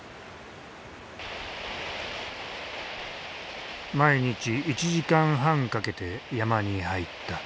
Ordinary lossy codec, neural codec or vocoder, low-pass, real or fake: none; none; none; real